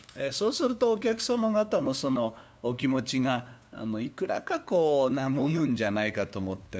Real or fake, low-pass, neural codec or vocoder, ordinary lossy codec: fake; none; codec, 16 kHz, 2 kbps, FunCodec, trained on LibriTTS, 25 frames a second; none